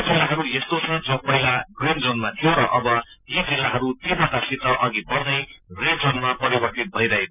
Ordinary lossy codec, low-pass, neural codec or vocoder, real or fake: none; 3.6 kHz; none; real